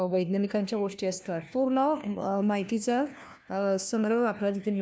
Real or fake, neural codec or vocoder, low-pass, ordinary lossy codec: fake; codec, 16 kHz, 1 kbps, FunCodec, trained on LibriTTS, 50 frames a second; none; none